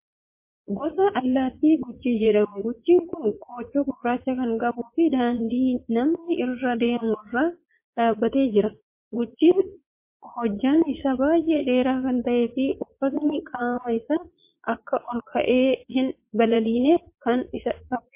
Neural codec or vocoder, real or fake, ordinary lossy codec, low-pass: vocoder, 22.05 kHz, 80 mel bands, Vocos; fake; MP3, 24 kbps; 3.6 kHz